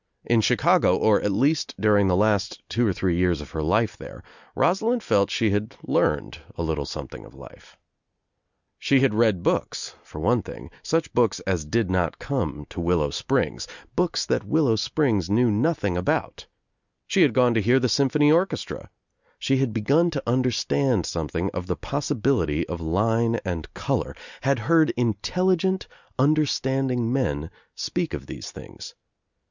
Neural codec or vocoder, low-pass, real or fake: none; 7.2 kHz; real